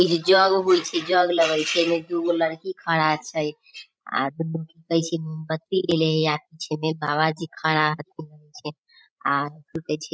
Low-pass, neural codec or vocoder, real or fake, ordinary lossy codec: none; codec, 16 kHz, 16 kbps, FreqCodec, larger model; fake; none